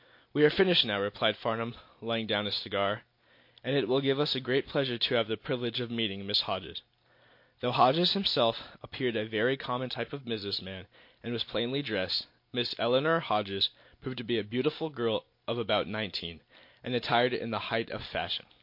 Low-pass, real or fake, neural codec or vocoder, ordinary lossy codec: 5.4 kHz; real; none; MP3, 32 kbps